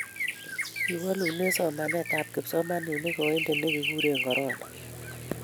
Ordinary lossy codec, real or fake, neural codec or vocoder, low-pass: none; real; none; none